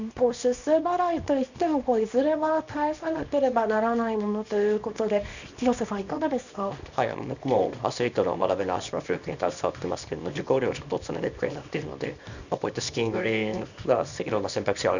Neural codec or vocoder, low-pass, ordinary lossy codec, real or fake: codec, 24 kHz, 0.9 kbps, WavTokenizer, small release; 7.2 kHz; none; fake